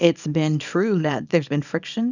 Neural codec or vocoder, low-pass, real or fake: codec, 24 kHz, 0.9 kbps, WavTokenizer, small release; 7.2 kHz; fake